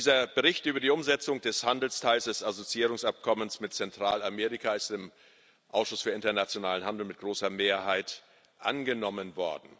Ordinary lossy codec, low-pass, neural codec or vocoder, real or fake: none; none; none; real